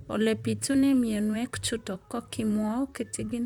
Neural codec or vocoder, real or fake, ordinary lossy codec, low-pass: vocoder, 44.1 kHz, 128 mel bands, Pupu-Vocoder; fake; none; 19.8 kHz